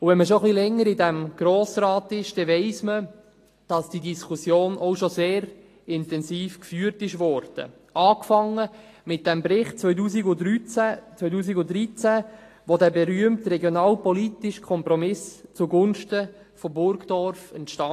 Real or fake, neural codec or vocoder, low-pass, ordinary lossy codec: real; none; 14.4 kHz; AAC, 48 kbps